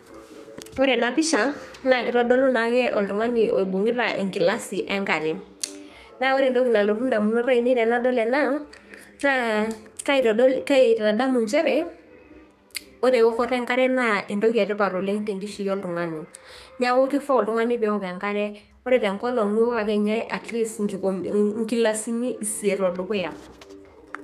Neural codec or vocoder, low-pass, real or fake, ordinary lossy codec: codec, 32 kHz, 1.9 kbps, SNAC; 14.4 kHz; fake; none